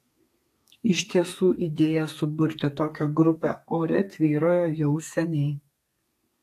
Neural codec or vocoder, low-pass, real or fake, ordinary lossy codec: codec, 32 kHz, 1.9 kbps, SNAC; 14.4 kHz; fake; AAC, 64 kbps